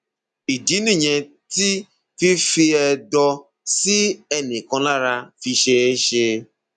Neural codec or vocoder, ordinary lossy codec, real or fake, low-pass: none; none; real; 10.8 kHz